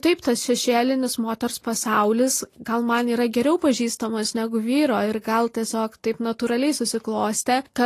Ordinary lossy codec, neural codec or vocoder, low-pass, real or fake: AAC, 48 kbps; none; 14.4 kHz; real